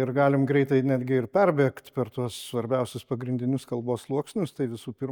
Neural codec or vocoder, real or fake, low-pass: none; real; 19.8 kHz